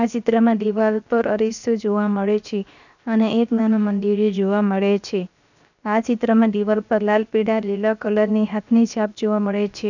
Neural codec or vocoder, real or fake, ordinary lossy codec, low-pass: codec, 16 kHz, 0.7 kbps, FocalCodec; fake; none; 7.2 kHz